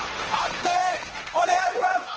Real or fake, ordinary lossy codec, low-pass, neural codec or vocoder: fake; Opus, 16 kbps; 7.2 kHz; codec, 16 kHz, 1.1 kbps, Voila-Tokenizer